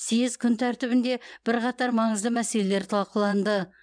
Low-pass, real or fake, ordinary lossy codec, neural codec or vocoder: 9.9 kHz; fake; none; vocoder, 22.05 kHz, 80 mel bands, WaveNeXt